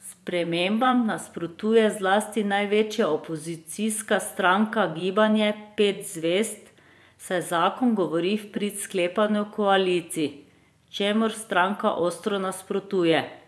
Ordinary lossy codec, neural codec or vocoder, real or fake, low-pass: none; vocoder, 24 kHz, 100 mel bands, Vocos; fake; none